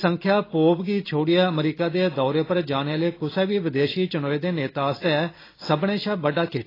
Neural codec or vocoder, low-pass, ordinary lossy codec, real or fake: none; 5.4 kHz; AAC, 24 kbps; real